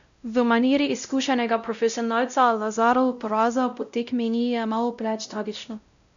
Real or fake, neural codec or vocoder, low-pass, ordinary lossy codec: fake; codec, 16 kHz, 0.5 kbps, X-Codec, WavLM features, trained on Multilingual LibriSpeech; 7.2 kHz; none